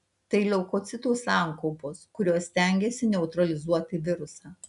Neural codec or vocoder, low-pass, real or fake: none; 10.8 kHz; real